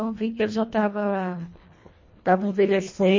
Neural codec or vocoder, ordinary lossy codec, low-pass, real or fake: codec, 24 kHz, 1.5 kbps, HILCodec; MP3, 32 kbps; 7.2 kHz; fake